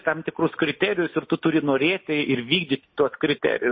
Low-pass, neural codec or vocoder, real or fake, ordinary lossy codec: 7.2 kHz; none; real; MP3, 24 kbps